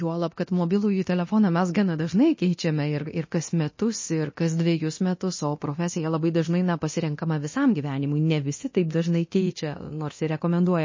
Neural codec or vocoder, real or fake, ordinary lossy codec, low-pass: codec, 24 kHz, 0.9 kbps, DualCodec; fake; MP3, 32 kbps; 7.2 kHz